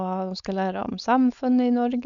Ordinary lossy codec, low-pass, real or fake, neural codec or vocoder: none; 7.2 kHz; fake; codec, 16 kHz, 4.8 kbps, FACodec